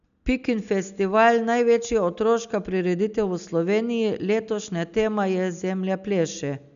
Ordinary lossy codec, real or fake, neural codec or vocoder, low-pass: none; real; none; 7.2 kHz